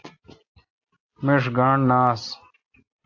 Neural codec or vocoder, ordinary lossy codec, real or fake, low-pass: none; AAC, 48 kbps; real; 7.2 kHz